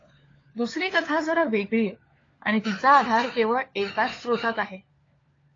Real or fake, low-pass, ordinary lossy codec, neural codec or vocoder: fake; 7.2 kHz; AAC, 32 kbps; codec, 16 kHz, 4 kbps, FunCodec, trained on LibriTTS, 50 frames a second